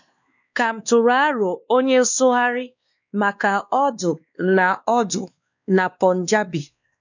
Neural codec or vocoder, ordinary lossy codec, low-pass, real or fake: codec, 16 kHz, 2 kbps, X-Codec, WavLM features, trained on Multilingual LibriSpeech; none; 7.2 kHz; fake